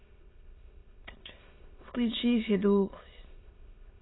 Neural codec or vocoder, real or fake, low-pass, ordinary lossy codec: autoencoder, 22.05 kHz, a latent of 192 numbers a frame, VITS, trained on many speakers; fake; 7.2 kHz; AAC, 16 kbps